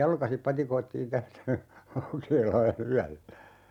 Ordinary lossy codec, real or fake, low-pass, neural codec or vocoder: none; real; 19.8 kHz; none